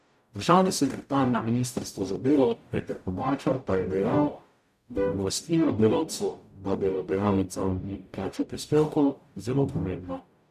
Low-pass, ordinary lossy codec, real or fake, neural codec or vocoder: 14.4 kHz; none; fake; codec, 44.1 kHz, 0.9 kbps, DAC